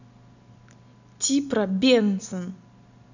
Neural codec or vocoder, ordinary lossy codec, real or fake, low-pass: none; none; real; 7.2 kHz